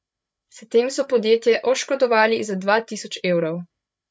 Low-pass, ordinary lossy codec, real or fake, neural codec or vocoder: none; none; fake; codec, 16 kHz, 8 kbps, FreqCodec, larger model